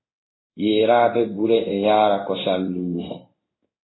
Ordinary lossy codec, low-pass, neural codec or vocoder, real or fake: AAC, 16 kbps; 7.2 kHz; codec, 16 kHz in and 24 kHz out, 1 kbps, XY-Tokenizer; fake